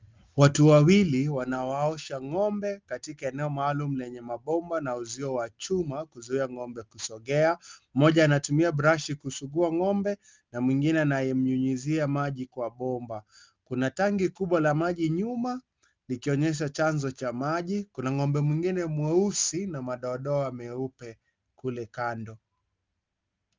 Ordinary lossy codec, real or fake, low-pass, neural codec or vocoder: Opus, 24 kbps; real; 7.2 kHz; none